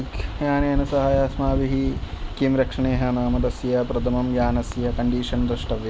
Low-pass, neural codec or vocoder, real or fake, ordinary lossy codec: none; none; real; none